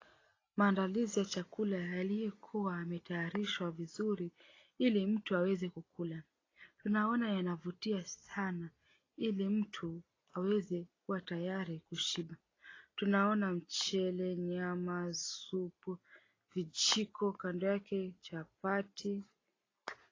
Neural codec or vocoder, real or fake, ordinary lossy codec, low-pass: none; real; AAC, 32 kbps; 7.2 kHz